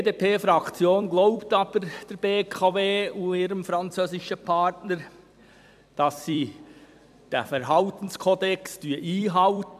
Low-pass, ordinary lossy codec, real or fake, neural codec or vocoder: 14.4 kHz; AAC, 96 kbps; fake; vocoder, 44.1 kHz, 128 mel bands every 256 samples, BigVGAN v2